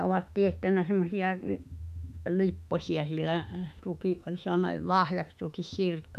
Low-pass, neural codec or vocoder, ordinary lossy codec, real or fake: 14.4 kHz; autoencoder, 48 kHz, 32 numbers a frame, DAC-VAE, trained on Japanese speech; none; fake